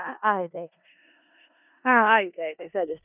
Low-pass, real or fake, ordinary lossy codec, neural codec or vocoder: 3.6 kHz; fake; none; codec, 16 kHz in and 24 kHz out, 0.4 kbps, LongCat-Audio-Codec, four codebook decoder